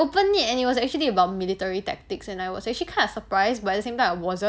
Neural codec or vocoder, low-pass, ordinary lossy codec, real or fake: none; none; none; real